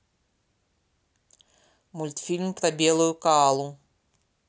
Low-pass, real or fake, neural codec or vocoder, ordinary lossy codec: none; real; none; none